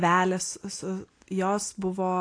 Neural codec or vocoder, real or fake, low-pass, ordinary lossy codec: none; real; 9.9 kHz; AAC, 48 kbps